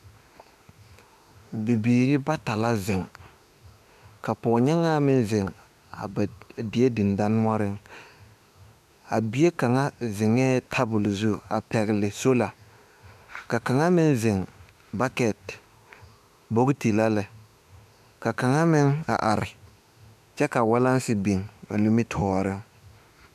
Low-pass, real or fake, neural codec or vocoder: 14.4 kHz; fake; autoencoder, 48 kHz, 32 numbers a frame, DAC-VAE, trained on Japanese speech